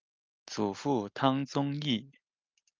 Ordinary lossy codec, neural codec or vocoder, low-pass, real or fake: Opus, 32 kbps; none; 7.2 kHz; real